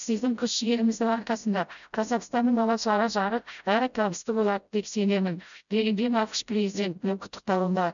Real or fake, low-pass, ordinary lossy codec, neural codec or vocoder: fake; 7.2 kHz; none; codec, 16 kHz, 0.5 kbps, FreqCodec, smaller model